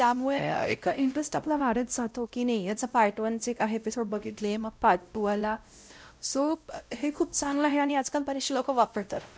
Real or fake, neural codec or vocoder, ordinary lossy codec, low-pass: fake; codec, 16 kHz, 0.5 kbps, X-Codec, WavLM features, trained on Multilingual LibriSpeech; none; none